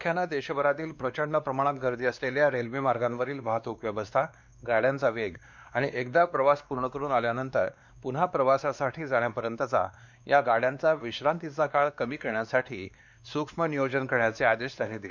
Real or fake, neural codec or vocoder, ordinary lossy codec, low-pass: fake; codec, 16 kHz, 2 kbps, X-Codec, WavLM features, trained on Multilingual LibriSpeech; none; 7.2 kHz